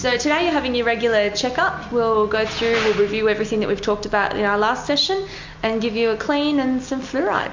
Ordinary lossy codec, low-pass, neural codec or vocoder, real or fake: MP3, 48 kbps; 7.2 kHz; none; real